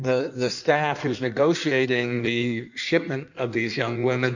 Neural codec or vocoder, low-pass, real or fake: codec, 16 kHz in and 24 kHz out, 1.1 kbps, FireRedTTS-2 codec; 7.2 kHz; fake